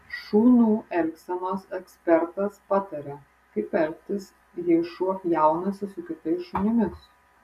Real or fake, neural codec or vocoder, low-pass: real; none; 14.4 kHz